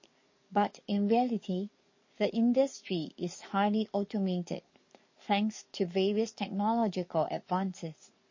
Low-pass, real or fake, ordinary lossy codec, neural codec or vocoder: 7.2 kHz; fake; MP3, 32 kbps; codec, 44.1 kHz, 7.8 kbps, DAC